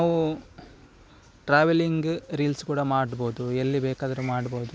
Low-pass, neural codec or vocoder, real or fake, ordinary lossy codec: none; none; real; none